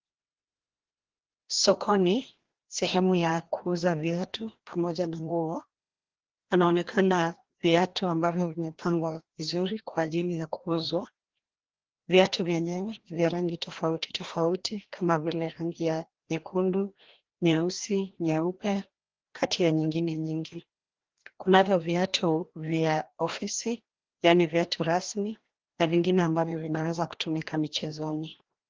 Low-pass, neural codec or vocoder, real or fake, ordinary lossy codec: 7.2 kHz; codec, 16 kHz, 1 kbps, FreqCodec, larger model; fake; Opus, 16 kbps